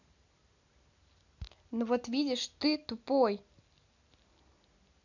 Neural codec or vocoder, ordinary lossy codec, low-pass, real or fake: none; none; 7.2 kHz; real